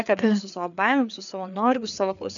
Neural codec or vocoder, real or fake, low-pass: codec, 16 kHz, 4 kbps, FreqCodec, larger model; fake; 7.2 kHz